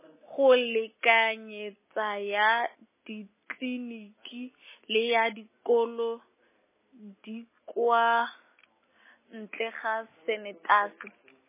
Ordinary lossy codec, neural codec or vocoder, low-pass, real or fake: MP3, 16 kbps; none; 3.6 kHz; real